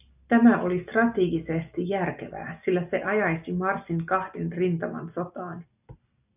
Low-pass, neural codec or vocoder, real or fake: 3.6 kHz; none; real